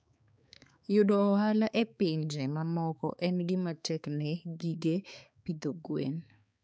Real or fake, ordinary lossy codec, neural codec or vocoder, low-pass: fake; none; codec, 16 kHz, 4 kbps, X-Codec, HuBERT features, trained on balanced general audio; none